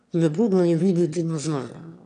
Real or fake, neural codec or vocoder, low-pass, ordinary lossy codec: fake; autoencoder, 22.05 kHz, a latent of 192 numbers a frame, VITS, trained on one speaker; 9.9 kHz; none